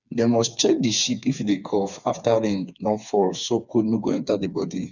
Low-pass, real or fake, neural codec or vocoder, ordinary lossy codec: 7.2 kHz; fake; codec, 16 kHz, 4 kbps, FreqCodec, smaller model; none